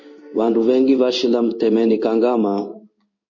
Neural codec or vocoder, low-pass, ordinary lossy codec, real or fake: codec, 16 kHz in and 24 kHz out, 1 kbps, XY-Tokenizer; 7.2 kHz; MP3, 32 kbps; fake